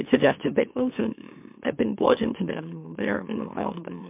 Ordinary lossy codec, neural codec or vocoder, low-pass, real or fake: MP3, 32 kbps; autoencoder, 44.1 kHz, a latent of 192 numbers a frame, MeloTTS; 3.6 kHz; fake